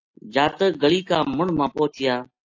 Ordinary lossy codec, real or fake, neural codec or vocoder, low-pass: AAC, 48 kbps; real; none; 7.2 kHz